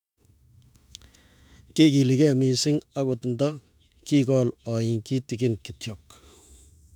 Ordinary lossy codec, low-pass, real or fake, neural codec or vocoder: none; 19.8 kHz; fake; autoencoder, 48 kHz, 32 numbers a frame, DAC-VAE, trained on Japanese speech